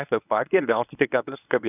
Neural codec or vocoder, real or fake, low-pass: codec, 24 kHz, 0.9 kbps, WavTokenizer, medium speech release version 1; fake; 3.6 kHz